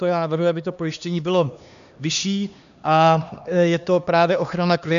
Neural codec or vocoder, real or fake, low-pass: codec, 16 kHz, 2 kbps, X-Codec, HuBERT features, trained on LibriSpeech; fake; 7.2 kHz